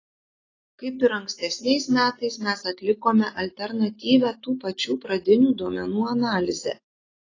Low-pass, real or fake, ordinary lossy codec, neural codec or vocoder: 7.2 kHz; real; AAC, 32 kbps; none